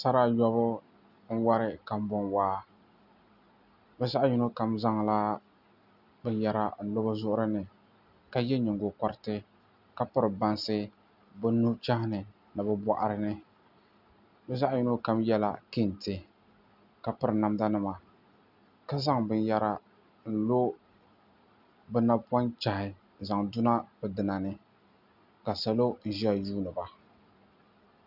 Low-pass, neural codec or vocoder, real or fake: 5.4 kHz; none; real